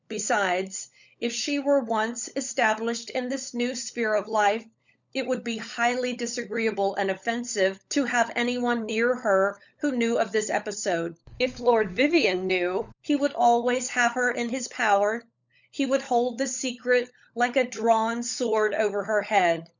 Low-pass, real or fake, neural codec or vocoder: 7.2 kHz; fake; codec, 16 kHz, 16 kbps, FunCodec, trained on LibriTTS, 50 frames a second